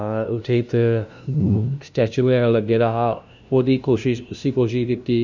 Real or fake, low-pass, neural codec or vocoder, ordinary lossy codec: fake; 7.2 kHz; codec, 16 kHz, 0.5 kbps, FunCodec, trained on LibriTTS, 25 frames a second; none